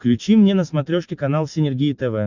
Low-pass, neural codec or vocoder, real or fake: 7.2 kHz; none; real